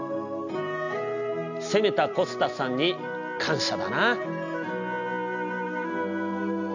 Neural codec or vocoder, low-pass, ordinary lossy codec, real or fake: none; 7.2 kHz; none; real